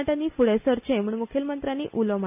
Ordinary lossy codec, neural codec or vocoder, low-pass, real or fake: none; none; 3.6 kHz; real